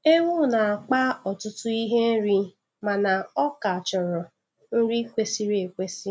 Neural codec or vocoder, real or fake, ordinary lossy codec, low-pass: none; real; none; none